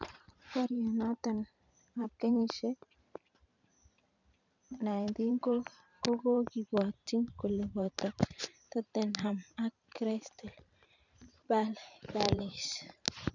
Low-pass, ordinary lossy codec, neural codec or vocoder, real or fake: 7.2 kHz; none; vocoder, 44.1 kHz, 128 mel bands every 512 samples, BigVGAN v2; fake